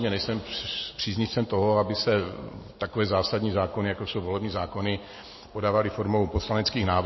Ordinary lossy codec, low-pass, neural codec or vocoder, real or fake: MP3, 24 kbps; 7.2 kHz; none; real